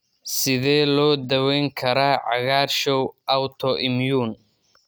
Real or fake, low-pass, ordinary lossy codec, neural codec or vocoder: real; none; none; none